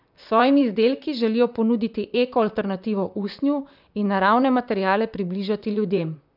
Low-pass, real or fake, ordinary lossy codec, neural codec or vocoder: 5.4 kHz; fake; none; vocoder, 44.1 kHz, 128 mel bands, Pupu-Vocoder